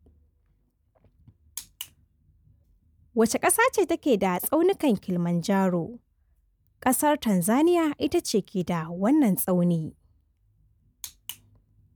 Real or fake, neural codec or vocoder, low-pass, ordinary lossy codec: real; none; none; none